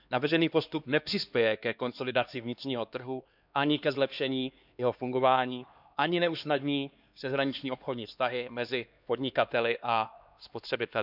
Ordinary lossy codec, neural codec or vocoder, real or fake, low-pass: none; codec, 16 kHz, 2 kbps, X-Codec, HuBERT features, trained on LibriSpeech; fake; 5.4 kHz